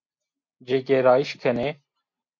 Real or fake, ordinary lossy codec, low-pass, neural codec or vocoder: real; MP3, 48 kbps; 7.2 kHz; none